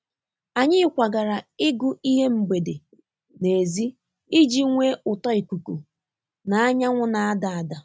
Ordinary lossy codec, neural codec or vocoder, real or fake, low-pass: none; none; real; none